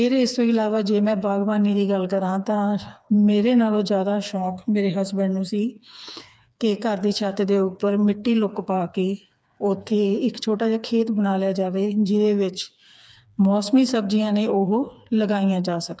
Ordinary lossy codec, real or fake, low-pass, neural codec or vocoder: none; fake; none; codec, 16 kHz, 4 kbps, FreqCodec, smaller model